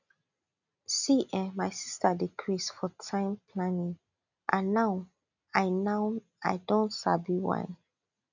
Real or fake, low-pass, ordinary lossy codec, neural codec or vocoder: real; 7.2 kHz; none; none